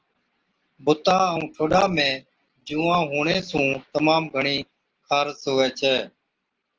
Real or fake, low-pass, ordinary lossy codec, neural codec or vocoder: real; 7.2 kHz; Opus, 16 kbps; none